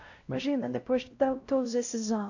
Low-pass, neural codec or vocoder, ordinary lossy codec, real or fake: 7.2 kHz; codec, 16 kHz, 0.5 kbps, X-Codec, WavLM features, trained on Multilingual LibriSpeech; AAC, 48 kbps; fake